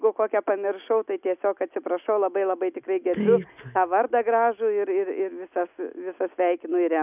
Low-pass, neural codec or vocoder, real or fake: 3.6 kHz; none; real